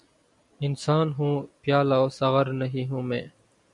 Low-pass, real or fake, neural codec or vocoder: 10.8 kHz; real; none